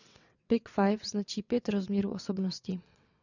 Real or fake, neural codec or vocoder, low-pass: fake; vocoder, 22.05 kHz, 80 mel bands, WaveNeXt; 7.2 kHz